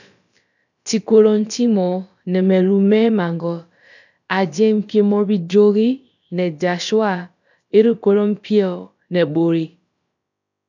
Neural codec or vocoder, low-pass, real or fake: codec, 16 kHz, about 1 kbps, DyCAST, with the encoder's durations; 7.2 kHz; fake